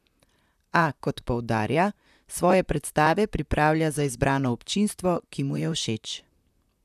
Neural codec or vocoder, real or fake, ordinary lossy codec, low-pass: vocoder, 44.1 kHz, 128 mel bands, Pupu-Vocoder; fake; none; 14.4 kHz